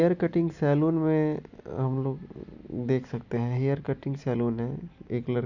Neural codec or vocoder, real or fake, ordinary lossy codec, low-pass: none; real; none; 7.2 kHz